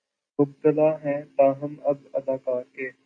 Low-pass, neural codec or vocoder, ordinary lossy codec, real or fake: 9.9 kHz; none; AAC, 48 kbps; real